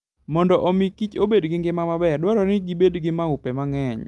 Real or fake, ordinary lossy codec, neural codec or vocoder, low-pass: real; Opus, 32 kbps; none; 10.8 kHz